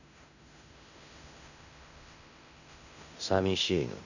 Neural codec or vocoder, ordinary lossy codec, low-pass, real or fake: codec, 16 kHz in and 24 kHz out, 0.9 kbps, LongCat-Audio-Codec, fine tuned four codebook decoder; none; 7.2 kHz; fake